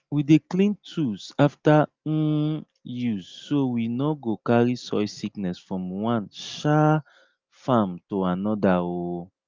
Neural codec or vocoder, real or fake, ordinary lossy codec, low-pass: none; real; Opus, 32 kbps; 7.2 kHz